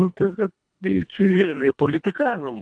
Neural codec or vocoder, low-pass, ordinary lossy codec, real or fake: codec, 24 kHz, 1.5 kbps, HILCodec; 9.9 kHz; MP3, 96 kbps; fake